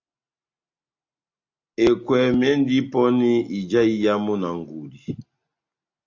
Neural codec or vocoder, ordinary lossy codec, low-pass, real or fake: none; AAC, 48 kbps; 7.2 kHz; real